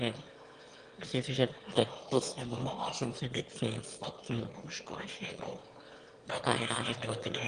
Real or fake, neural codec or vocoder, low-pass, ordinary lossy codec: fake; autoencoder, 22.05 kHz, a latent of 192 numbers a frame, VITS, trained on one speaker; 9.9 kHz; Opus, 24 kbps